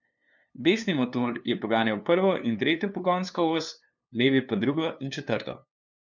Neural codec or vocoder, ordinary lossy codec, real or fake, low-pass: codec, 16 kHz, 2 kbps, FunCodec, trained on LibriTTS, 25 frames a second; none; fake; 7.2 kHz